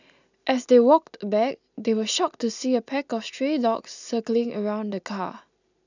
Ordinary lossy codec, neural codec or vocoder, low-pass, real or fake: none; none; 7.2 kHz; real